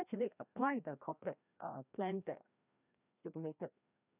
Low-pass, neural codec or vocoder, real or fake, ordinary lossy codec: 3.6 kHz; codec, 16 kHz, 1 kbps, FreqCodec, larger model; fake; none